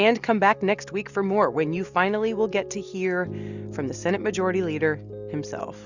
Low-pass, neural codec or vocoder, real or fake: 7.2 kHz; none; real